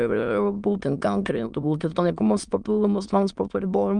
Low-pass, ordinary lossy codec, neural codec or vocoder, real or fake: 9.9 kHz; Opus, 32 kbps; autoencoder, 22.05 kHz, a latent of 192 numbers a frame, VITS, trained on many speakers; fake